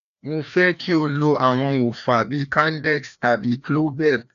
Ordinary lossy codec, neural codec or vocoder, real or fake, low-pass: none; codec, 16 kHz, 1 kbps, FreqCodec, larger model; fake; 7.2 kHz